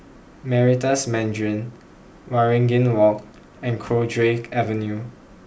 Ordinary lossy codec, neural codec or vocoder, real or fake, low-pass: none; none; real; none